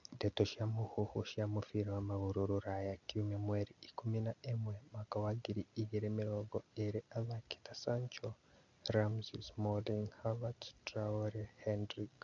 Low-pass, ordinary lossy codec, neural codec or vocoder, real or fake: 7.2 kHz; none; none; real